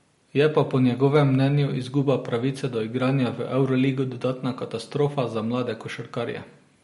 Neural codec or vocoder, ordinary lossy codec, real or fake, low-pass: none; MP3, 48 kbps; real; 19.8 kHz